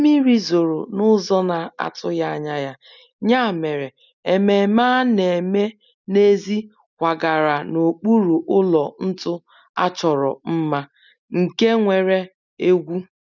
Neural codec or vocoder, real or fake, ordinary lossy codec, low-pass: none; real; none; 7.2 kHz